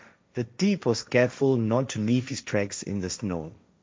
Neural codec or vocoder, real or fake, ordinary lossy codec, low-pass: codec, 16 kHz, 1.1 kbps, Voila-Tokenizer; fake; none; none